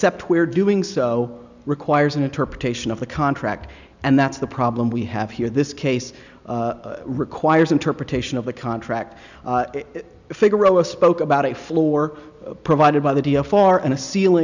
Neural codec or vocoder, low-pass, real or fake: none; 7.2 kHz; real